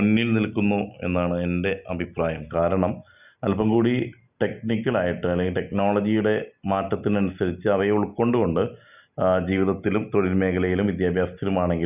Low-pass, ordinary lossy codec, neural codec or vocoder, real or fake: 3.6 kHz; none; none; real